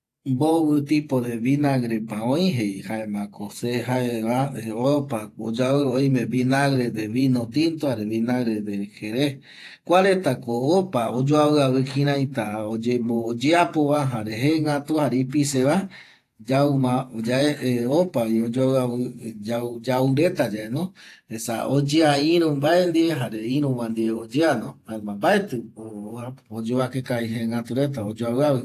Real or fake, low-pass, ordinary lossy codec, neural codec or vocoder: fake; 14.4 kHz; AAC, 64 kbps; vocoder, 48 kHz, 128 mel bands, Vocos